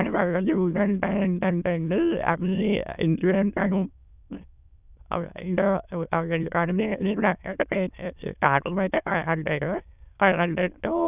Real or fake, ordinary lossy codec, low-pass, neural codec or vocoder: fake; none; 3.6 kHz; autoencoder, 22.05 kHz, a latent of 192 numbers a frame, VITS, trained on many speakers